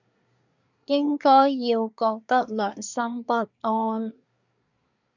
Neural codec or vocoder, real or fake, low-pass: codec, 24 kHz, 1 kbps, SNAC; fake; 7.2 kHz